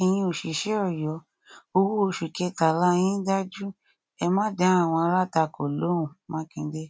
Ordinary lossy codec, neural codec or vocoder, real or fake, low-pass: none; none; real; none